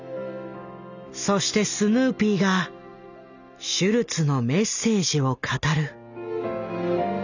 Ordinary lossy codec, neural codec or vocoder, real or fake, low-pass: none; none; real; 7.2 kHz